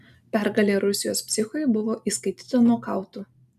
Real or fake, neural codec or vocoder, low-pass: real; none; 14.4 kHz